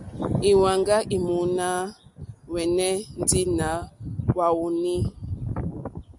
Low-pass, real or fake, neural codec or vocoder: 10.8 kHz; real; none